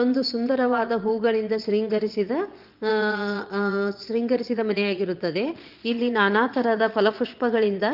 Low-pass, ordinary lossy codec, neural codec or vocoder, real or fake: 5.4 kHz; Opus, 32 kbps; vocoder, 22.05 kHz, 80 mel bands, Vocos; fake